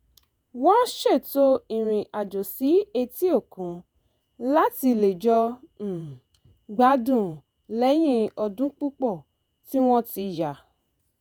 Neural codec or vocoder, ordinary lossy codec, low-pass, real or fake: vocoder, 48 kHz, 128 mel bands, Vocos; none; none; fake